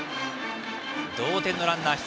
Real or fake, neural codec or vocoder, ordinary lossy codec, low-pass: real; none; none; none